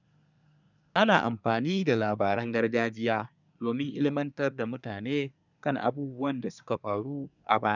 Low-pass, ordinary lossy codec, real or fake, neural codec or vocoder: 7.2 kHz; none; fake; codec, 24 kHz, 1 kbps, SNAC